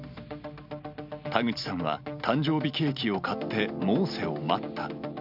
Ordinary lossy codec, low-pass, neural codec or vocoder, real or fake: none; 5.4 kHz; none; real